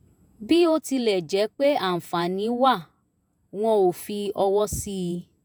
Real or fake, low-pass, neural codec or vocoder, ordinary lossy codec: fake; none; vocoder, 48 kHz, 128 mel bands, Vocos; none